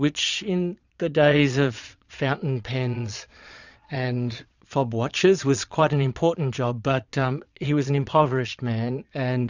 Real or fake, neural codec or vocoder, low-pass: fake; vocoder, 22.05 kHz, 80 mel bands, Vocos; 7.2 kHz